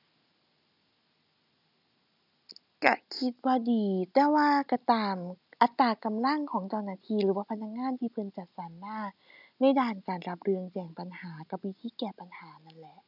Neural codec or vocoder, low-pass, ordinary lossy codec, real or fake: none; 5.4 kHz; none; real